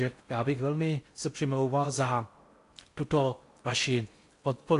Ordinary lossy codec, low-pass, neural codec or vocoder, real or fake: AAC, 48 kbps; 10.8 kHz; codec, 16 kHz in and 24 kHz out, 0.6 kbps, FocalCodec, streaming, 4096 codes; fake